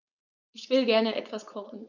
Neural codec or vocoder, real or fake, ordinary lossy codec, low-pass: codec, 16 kHz, 4.8 kbps, FACodec; fake; none; 7.2 kHz